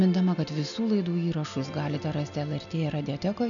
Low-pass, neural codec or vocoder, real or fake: 7.2 kHz; none; real